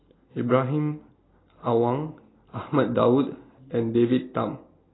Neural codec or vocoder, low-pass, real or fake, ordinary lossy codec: none; 7.2 kHz; real; AAC, 16 kbps